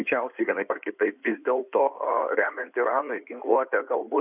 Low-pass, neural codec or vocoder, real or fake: 3.6 kHz; codec, 16 kHz in and 24 kHz out, 2.2 kbps, FireRedTTS-2 codec; fake